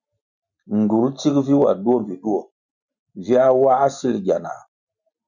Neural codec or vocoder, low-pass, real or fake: none; 7.2 kHz; real